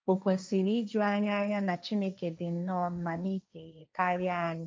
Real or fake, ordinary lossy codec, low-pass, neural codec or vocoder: fake; none; none; codec, 16 kHz, 1.1 kbps, Voila-Tokenizer